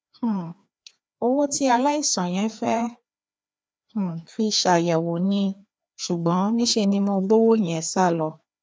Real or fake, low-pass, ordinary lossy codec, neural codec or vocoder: fake; none; none; codec, 16 kHz, 2 kbps, FreqCodec, larger model